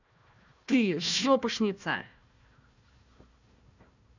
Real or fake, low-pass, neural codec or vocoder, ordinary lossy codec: fake; 7.2 kHz; codec, 16 kHz, 1 kbps, FunCodec, trained on Chinese and English, 50 frames a second; none